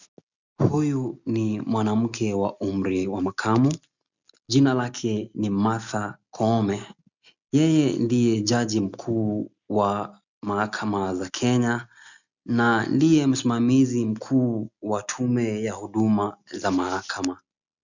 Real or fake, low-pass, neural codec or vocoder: real; 7.2 kHz; none